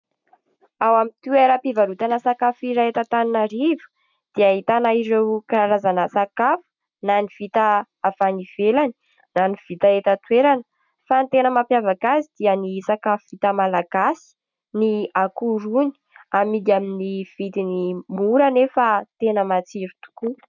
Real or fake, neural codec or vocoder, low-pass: real; none; 7.2 kHz